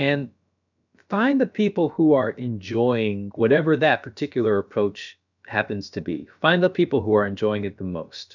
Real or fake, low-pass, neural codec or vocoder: fake; 7.2 kHz; codec, 16 kHz, about 1 kbps, DyCAST, with the encoder's durations